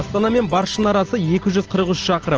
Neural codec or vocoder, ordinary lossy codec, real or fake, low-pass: none; Opus, 16 kbps; real; 7.2 kHz